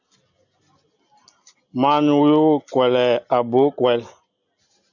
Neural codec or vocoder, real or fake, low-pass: none; real; 7.2 kHz